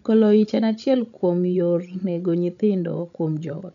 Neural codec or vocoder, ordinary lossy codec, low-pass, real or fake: codec, 16 kHz, 16 kbps, FreqCodec, larger model; none; 7.2 kHz; fake